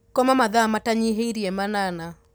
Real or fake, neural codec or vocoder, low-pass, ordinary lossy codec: real; none; none; none